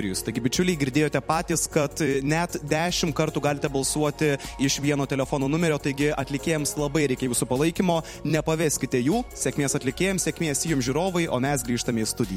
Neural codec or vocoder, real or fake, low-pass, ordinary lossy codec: vocoder, 44.1 kHz, 128 mel bands every 256 samples, BigVGAN v2; fake; 19.8 kHz; MP3, 64 kbps